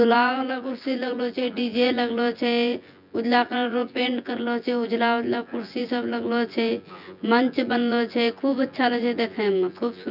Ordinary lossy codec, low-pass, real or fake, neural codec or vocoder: none; 5.4 kHz; fake; vocoder, 24 kHz, 100 mel bands, Vocos